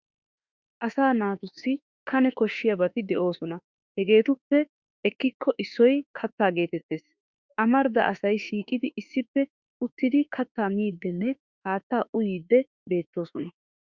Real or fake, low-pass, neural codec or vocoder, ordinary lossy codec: fake; 7.2 kHz; autoencoder, 48 kHz, 32 numbers a frame, DAC-VAE, trained on Japanese speech; Opus, 64 kbps